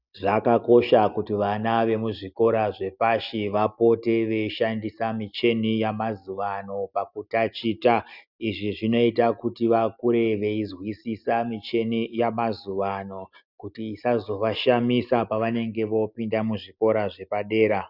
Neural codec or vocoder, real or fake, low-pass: none; real; 5.4 kHz